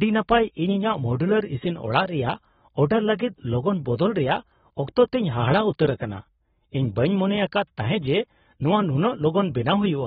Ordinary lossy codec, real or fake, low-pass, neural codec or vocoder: AAC, 16 kbps; fake; 19.8 kHz; codec, 44.1 kHz, 7.8 kbps, Pupu-Codec